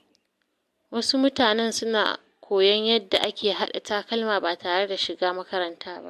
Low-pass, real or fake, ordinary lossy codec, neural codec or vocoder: 14.4 kHz; real; MP3, 64 kbps; none